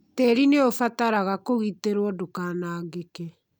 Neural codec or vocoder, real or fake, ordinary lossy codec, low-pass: none; real; none; none